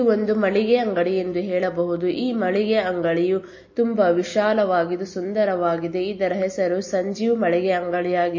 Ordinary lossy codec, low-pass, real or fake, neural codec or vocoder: MP3, 32 kbps; 7.2 kHz; real; none